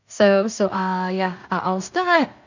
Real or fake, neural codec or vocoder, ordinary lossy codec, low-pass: fake; codec, 16 kHz in and 24 kHz out, 0.4 kbps, LongCat-Audio-Codec, two codebook decoder; none; 7.2 kHz